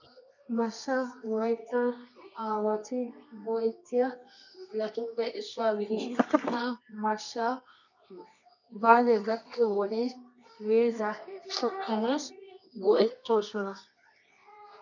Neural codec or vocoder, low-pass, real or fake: codec, 24 kHz, 0.9 kbps, WavTokenizer, medium music audio release; 7.2 kHz; fake